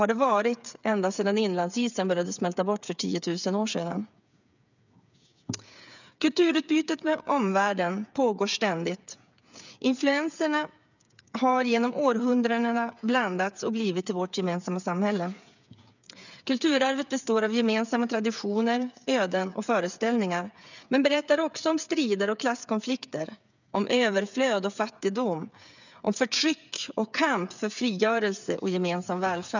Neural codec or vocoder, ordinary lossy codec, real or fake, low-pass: codec, 16 kHz, 8 kbps, FreqCodec, smaller model; none; fake; 7.2 kHz